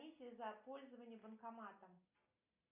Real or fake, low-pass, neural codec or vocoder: real; 3.6 kHz; none